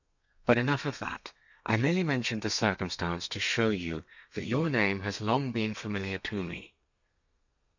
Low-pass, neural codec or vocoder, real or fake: 7.2 kHz; codec, 32 kHz, 1.9 kbps, SNAC; fake